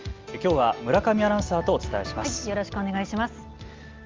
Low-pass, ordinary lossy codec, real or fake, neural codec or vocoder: 7.2 kHz; Opus, 32 kbps; real; none